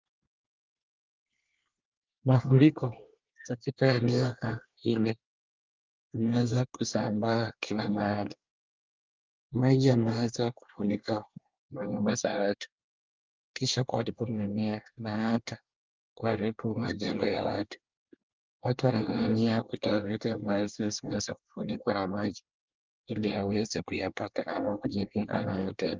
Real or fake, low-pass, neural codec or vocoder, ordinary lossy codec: fake; 7.2 kHz; codec, 24 kHz, 1 kbps, SNAC; Opus, 24 kbps